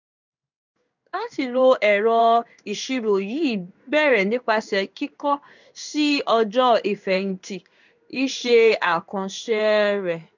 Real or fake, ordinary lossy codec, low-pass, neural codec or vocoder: fake; none; 7.2 kHz; codec, 16 kHz in and 24 kHz out, 1 kbps, XY-Tokenizer